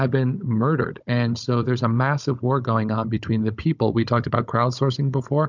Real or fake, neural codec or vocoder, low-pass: fake; codec, 16 kHz, 16 kbps, FunCodec, trained on Chinese and English, 50 frames a second; 7.2 kHz